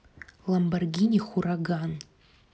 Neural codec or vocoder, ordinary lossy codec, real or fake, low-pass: none; none; real; none